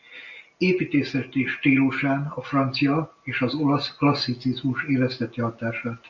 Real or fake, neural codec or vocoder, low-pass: real; none; 7.2 kHz